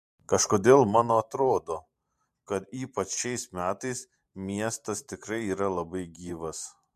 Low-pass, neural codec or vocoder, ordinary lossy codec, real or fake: 14.4 kHz; vocoder, 44.1 kHz, 128 mel bands every 512 samples, BigVGAN v2; MP3, 64 kbps; fake